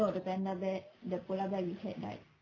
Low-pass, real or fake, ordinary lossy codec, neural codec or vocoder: 7.2 kHz; real; none; none